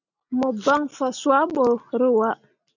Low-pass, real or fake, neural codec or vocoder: 7.2 kHz; real; none